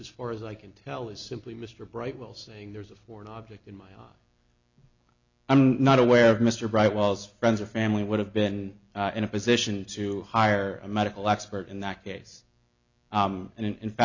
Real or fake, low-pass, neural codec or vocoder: real; 7.2 kHz; none